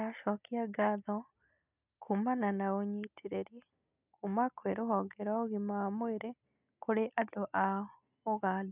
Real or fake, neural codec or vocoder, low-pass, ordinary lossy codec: real; none; 3.6 kHz; none